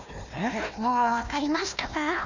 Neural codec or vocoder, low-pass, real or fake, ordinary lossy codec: codec, 16 kHz, 1 kbps, FunCodec, trained on Chinese and English, 50 frames a second; 7.2 kHz; fake; none